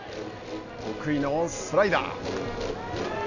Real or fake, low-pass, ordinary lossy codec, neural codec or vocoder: real; 7.2 kHz; none; none